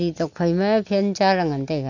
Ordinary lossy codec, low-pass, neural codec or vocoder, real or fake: none; 7.2 kHz; none; real